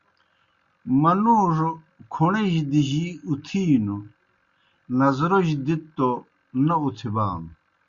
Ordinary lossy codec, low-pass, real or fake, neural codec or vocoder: Opus, 64 kbps; 7.2 kHz; real; none